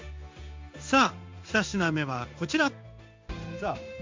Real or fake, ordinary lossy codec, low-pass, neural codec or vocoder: fake; MP3, 48 kbps; 7.2 kHz; codec, 16 kHz in and 24 kHz out, 1 kbps, XY-Tokenizer